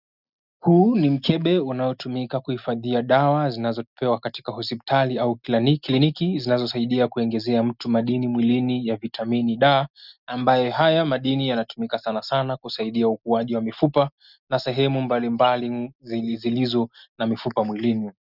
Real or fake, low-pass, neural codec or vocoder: real; 5.4 kHz; none